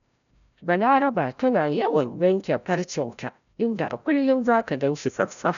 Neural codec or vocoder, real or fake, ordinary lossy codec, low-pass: codec, 16 kHz, 0.5 kbps, FreqCodec, larger model; fake; none; 7.2 kHz